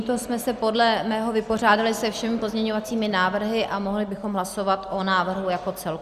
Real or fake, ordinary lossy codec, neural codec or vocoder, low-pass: real; Opus, 64 kbps; none; 14.4 kHz